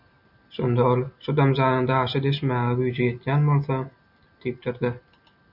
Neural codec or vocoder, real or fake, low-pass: none; real; 5.4 kHz